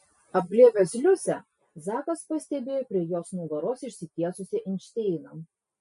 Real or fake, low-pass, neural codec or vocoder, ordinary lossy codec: real; 14.4 kHz; none; MP3, 48 kbps